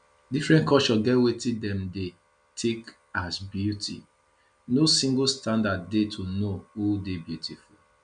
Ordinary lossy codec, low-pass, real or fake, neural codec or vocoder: none; 9.9 kHz; real; none